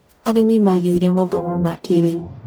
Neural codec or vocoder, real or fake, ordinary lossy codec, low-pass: codec, 44.1 kHz, 0.9 kbps, DAC; fake; none; none